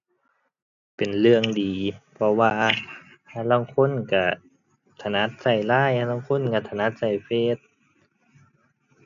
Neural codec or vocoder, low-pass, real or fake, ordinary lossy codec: none; 7.2 kHz; real; none